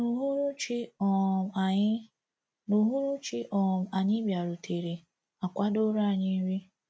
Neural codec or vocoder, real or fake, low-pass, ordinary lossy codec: none; real; none; none